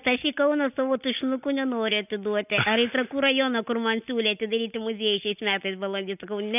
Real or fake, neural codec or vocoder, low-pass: real; none; 3.6 kHz